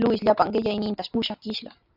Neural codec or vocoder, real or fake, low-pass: none; real; 5.4 kHz